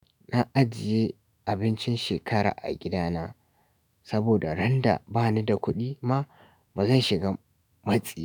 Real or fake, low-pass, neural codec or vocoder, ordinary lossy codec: fake; none; autoencoder, 48 kHz, 128 numbers a frame, DAC-VAE, trained on Japanese speech; none